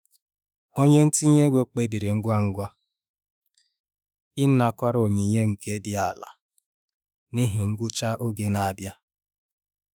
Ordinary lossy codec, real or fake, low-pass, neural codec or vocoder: none; fake; none; autoencoder, 48 kHz, 32 numbers a frame, DAC-VAE, trained on Japanese speech